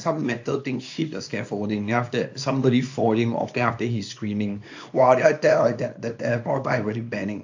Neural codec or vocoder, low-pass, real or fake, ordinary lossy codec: codec, 24 kHz, 0.9 kbps, WavTokenizer, small release; 7.2 kHz; fake; AAC, 48 kbps